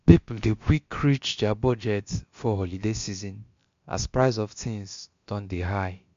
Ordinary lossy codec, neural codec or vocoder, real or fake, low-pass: MP3, 48 kbps; codec, 16 kHz, about 1 kbps, DyCAST, with the encoder's durations; fake; 7.2 kHz